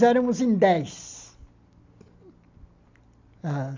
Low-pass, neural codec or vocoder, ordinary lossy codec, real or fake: 7.2 kHz; none; MP3, 64 kbps; real